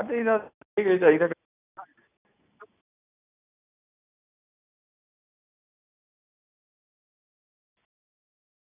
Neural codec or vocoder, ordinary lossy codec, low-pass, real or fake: none; none; 3.6 kHz; real